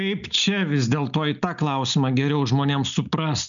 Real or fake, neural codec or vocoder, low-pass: real; none; 7.2 kHz